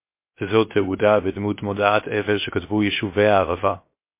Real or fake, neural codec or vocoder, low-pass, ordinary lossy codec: fake; codec, 16 kHz, 0.7 kbps, FocalCodec; 3.6 kHz; MP3, 24 kbps